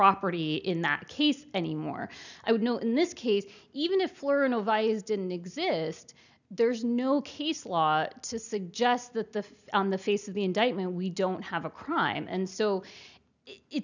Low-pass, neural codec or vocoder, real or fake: 7.2 kHz; none; real